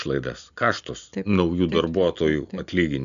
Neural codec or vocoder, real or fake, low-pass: none; real; 7.2 kHz